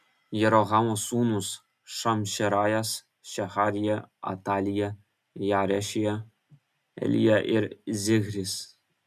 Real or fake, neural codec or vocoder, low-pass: real; none; 14.4 kHz